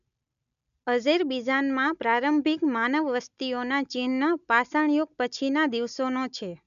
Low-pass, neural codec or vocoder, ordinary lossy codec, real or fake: 7.2 kHz; none; none; real